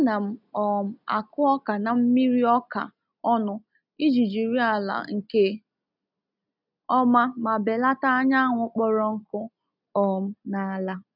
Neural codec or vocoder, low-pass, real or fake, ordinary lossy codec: none; 5.4 kHz; real; none